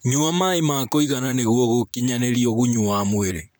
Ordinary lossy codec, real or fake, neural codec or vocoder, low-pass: none; fake; vocoder, 44.1 kHz, 128 mel bands, Pupu-Vocoder; none